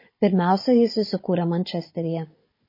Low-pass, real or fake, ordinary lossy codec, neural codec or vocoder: 5.4 kHz; fake; MP3, 24 kbps; codec, 16 kHz, 16 kbps, FunCodec, trained on Chinese and English, 50 frames a second